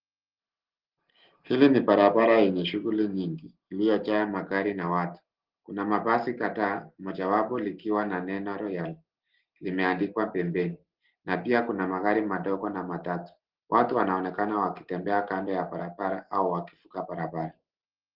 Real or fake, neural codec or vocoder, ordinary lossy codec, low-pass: real; none; Opus, 16 kbps; 5.4 kHz